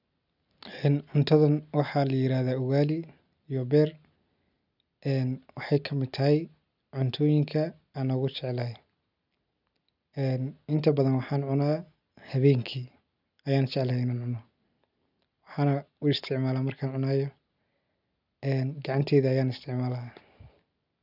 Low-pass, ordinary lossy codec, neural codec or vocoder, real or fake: 5.4 kHz; none; none; real